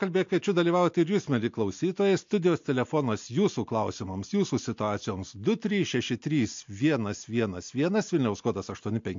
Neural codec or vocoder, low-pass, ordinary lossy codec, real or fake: none; 7.2 kHz; MP3, 48 kbps; real